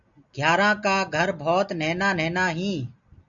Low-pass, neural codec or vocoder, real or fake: 7.2 kHz; none; real